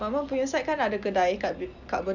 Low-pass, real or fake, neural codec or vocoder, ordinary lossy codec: 7.2 kHz; real; none; none